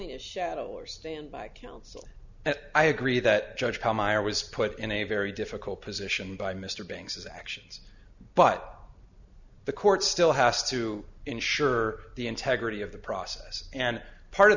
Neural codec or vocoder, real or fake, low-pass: none; real; 7.2 kHz